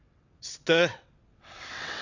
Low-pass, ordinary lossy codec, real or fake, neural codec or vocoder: 7.2 kHz; none; fake; vocoder, 22.05 kHz, 80 mel bands, WaveNeXt